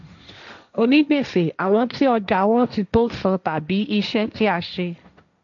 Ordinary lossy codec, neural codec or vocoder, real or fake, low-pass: none; codec, 16 kHz, 1.1 kbps, Voila-Tokenizer; fake; 7.2 kHz